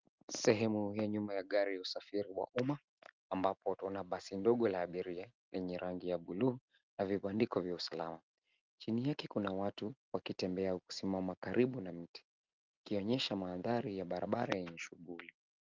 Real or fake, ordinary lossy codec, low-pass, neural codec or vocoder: real; Opus, 24 kbps; 7.2 kHz; none